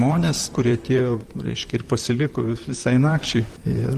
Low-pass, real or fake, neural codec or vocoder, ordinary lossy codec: 14.4 kHz; fake; vocoder, 44.1 kHz, 128 mel bands, Pupu-Vocoder; Opus, 24 kbps